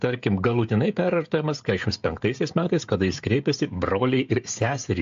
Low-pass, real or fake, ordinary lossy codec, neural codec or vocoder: 7.2 kHz; fake; AAC, 64 kbps; codec, 16 kHz, 16 kbps, FreqCodec, smaller model